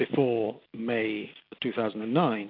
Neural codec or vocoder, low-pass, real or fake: none; 5.4 kHz; real